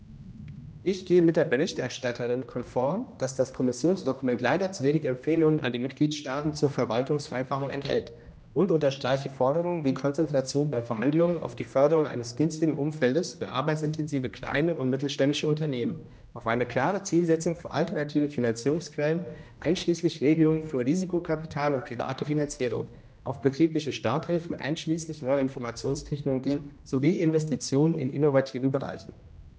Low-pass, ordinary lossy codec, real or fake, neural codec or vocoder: none; none; fake; codec, 16 kHz, 1 kbps, X-Codec, HuBERT features, trained on general audio